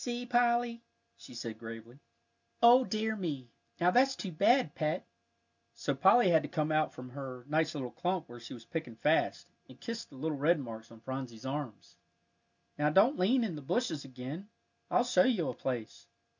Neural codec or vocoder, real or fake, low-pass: none; real; 7.2 kHz